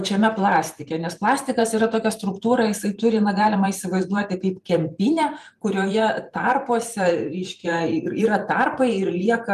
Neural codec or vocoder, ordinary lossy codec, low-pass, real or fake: vocoder, 44.1 kHz, 128 mel bands every 512 samples, BigVGAN v2; Opus, 32 kbps; 14.4 kHz; fake